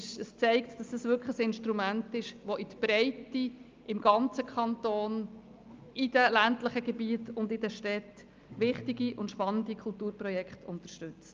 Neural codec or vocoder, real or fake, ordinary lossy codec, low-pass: none; real; Opus, 24 kbps; 7.2 kHz